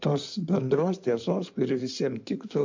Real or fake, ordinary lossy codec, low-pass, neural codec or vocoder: fake; MP3, 48 kbps; 7.2 kHz; codec, 16 kHz in and 24 kHz out, 2.2 kbps, FireRedTTS-2 codec